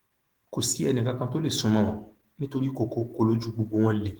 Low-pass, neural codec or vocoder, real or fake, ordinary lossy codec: 19.8 kHz; autoencoder, 48 kHz, 128 numbers a frame, DAC-VAE, trained on Japanese speech; fake; Opus, 16 kbps